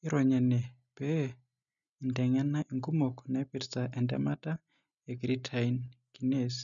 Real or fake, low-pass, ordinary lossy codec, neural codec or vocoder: real; 7.2 kHz; none; none